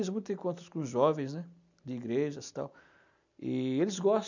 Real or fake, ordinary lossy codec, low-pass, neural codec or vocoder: real; none; 7.2 kHz; none